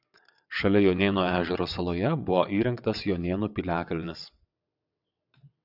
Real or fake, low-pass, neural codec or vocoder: fake; 5.4 kHz; vocoder, 22.05 kHz, 80 mel bands, WaveNeXt